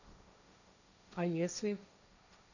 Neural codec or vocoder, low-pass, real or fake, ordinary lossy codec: codec, 16 kHz, 1.1 kbps, Voila-Tokenizer; 7.2 kHz; fake; none